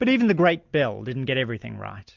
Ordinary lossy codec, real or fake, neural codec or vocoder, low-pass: MP3, 64 kbps; real; none; 7.2 kHz